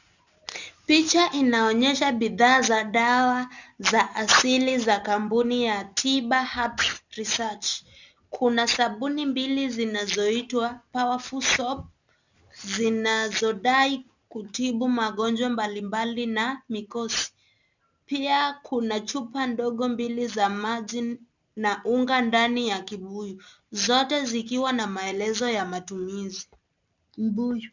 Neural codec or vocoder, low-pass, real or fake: none; 7.2 kHz; real